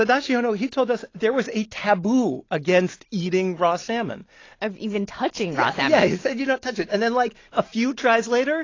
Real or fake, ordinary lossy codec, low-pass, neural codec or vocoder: real; AAC, 32 kbps; 7.2 kHz; none